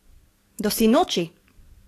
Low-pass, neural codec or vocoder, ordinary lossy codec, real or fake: 14.4 kHz; codec, 44.1 kHz, 7.8 kbps, DAC; AAC, 48 kbps; fake